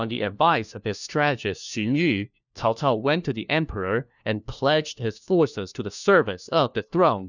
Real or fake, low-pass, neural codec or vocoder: fake; 7.2 kHz; codec, 16 kHz, 1 kbps, FunCodec, trained on LibriTTS, 50 frames a second